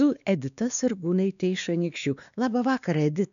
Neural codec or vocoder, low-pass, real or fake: codec, 16 kHz, 2 kbps, X-Codec, WavLM features, trained on Multilingual LibriSpeech; 7.2 kHz; fake